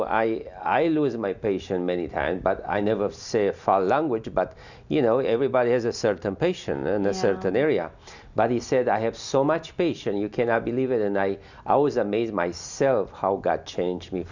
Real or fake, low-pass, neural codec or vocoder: real; 7.2 kHz; none